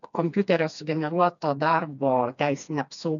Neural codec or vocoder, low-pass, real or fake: codec, 16 kHz, 2 kbps, FreqCodec, smaller model; 7.2 kHz; fake